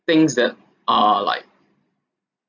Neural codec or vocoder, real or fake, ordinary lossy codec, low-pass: none; real; none; 7.2 kHz